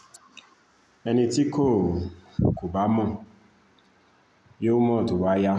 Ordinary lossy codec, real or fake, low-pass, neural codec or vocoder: none; real; none; none